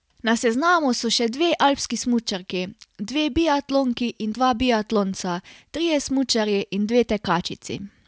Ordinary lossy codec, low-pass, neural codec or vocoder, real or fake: none; none; none; real